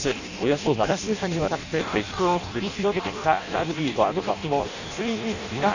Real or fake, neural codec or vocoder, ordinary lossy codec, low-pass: fake; codec, 16 kHz in and 24 kHz out, 0.6 kbps, FireRedTTS-2 codec; none; 7.2 kHz